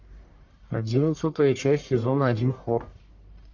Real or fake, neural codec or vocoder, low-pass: fake; codec, 44.1 kHz, 1.7 kbps, Pupu-Codec; 7.2 kHz